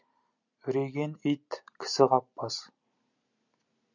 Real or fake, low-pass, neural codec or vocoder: real; 7.2 kHz; none